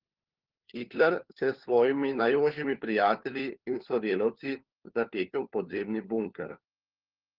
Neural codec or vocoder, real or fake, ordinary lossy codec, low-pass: codec, 16 kHz, 4 kbps, FunCodec, trained on LibriTTS, 50 frames a second; fake; Opus, 16 kbps; 5.4 kHz